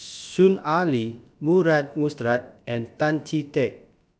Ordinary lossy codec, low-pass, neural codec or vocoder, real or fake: none; none; codec, 16 kHz, about 1 kbps, DyCAST, with the encoder's durations; fake